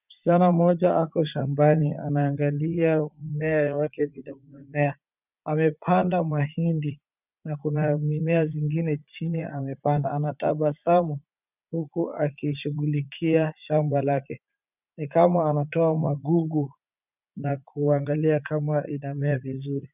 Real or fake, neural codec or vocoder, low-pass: fake; vocoder, 44.1 kHz, 80 mel bands, Vocos; 3.6 kHz